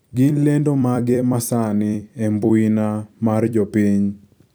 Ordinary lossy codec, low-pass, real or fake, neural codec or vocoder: none; none; fake; vocoder, 44.1 kHz, 128 mel bands every 256 samples, BigVGAN v2